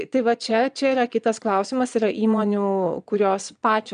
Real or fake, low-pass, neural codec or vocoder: fake; 9.9 kHz; vocoder, 22.05 kHz, 80 mel bands, Vocos